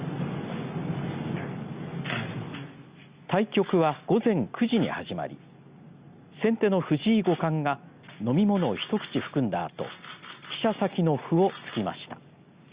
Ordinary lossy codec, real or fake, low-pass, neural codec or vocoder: Opus, 64 kbps; real; 3.6 kHz; none